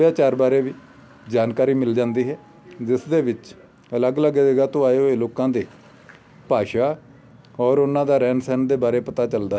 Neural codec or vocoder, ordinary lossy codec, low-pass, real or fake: none; none; none; real